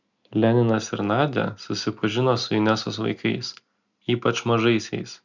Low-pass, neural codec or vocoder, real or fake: 7.2 kHz; none; real